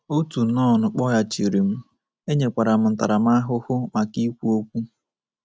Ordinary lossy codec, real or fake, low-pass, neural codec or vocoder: none; real; none; none